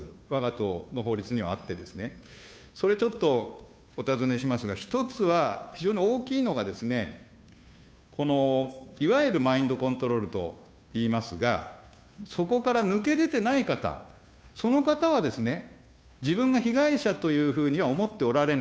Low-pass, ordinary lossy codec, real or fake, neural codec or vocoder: none; none; fake; codec, 16 kHz, 2 kbps, FunCodec, trained on Chinese and English, 25 frames a second